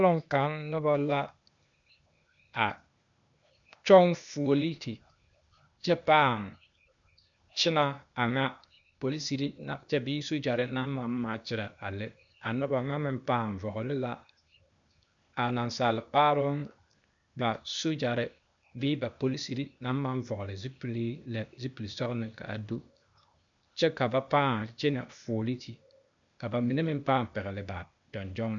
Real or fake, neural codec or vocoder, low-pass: fake; codec, 16 kHz, 0.8 kbps, ZipCodec; 7.2 kHz